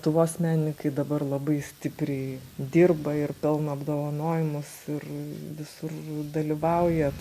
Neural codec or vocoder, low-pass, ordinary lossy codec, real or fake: none; 14.4 kHz; AAC, 96 kbps; real